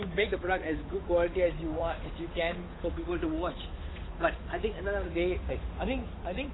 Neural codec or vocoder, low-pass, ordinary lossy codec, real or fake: codec, 16 kHz, 8 kbps, FunCodec, trained on Chinese and English, 25 frames a second; 7.2 kHz; AAC, 16 kbps; fake